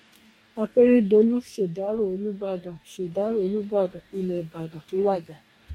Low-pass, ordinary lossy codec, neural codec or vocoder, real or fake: 19.8 kHz; MP3, 64 kbps; codec, 44.1 kHz, 2.6 kbps, DAC; fake